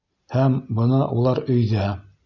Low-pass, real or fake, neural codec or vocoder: 7.2 kHz; real; none